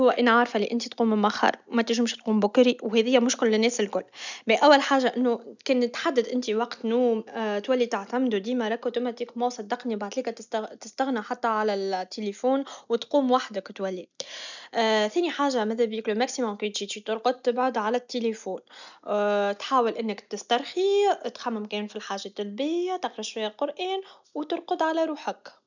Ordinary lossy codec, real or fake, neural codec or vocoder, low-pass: none; real; none; 7.2 kHz